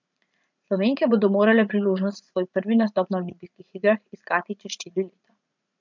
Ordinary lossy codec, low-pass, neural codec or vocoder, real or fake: MP3, 64 kbps; 7.2 kHz; vocoder, 44.1 kHz, 80 mel bands, Vocos; fake